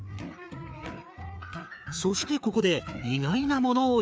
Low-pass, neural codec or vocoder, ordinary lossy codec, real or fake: none; codec, 16 kHz, 4 kbps, FreqCodec, larger model; none; fake